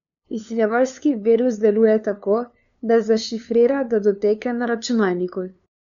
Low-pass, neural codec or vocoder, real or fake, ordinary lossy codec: 7.2 kHz; codec, 16 kHz, 2 kbps, FunCodec, trained on LibriTTS, 25 frames a second; fake; none